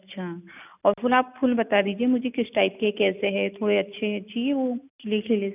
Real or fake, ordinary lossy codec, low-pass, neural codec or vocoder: real; none; 3.6 kHz; none